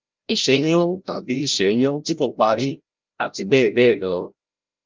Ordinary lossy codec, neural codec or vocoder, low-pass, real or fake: Opus, 32 kbps; codec, 16 kHz, 0.5 kbps, FreqCodec, larger model; 7.2 kHz; fake